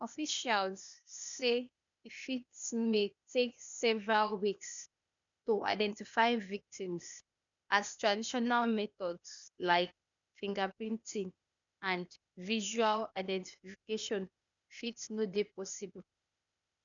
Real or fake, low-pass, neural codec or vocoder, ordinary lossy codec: fake; 7.2 kHz; codec, 16 kHz, 0.8 kbps, ZipCodec; none